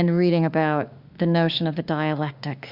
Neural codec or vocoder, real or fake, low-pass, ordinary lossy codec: autoencoder, 48 kHz, 32 numbers a frame, DAC-VAE, trained on Japanese speech; fake; 5.4 kHz; Opus, 64 kbps